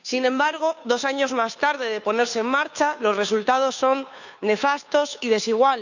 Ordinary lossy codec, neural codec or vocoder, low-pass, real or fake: none; codec, 16 kHz, 2 kbps, FunCodec, trained on Chinese and English, 25 frames a second; 7.2 kHz; fake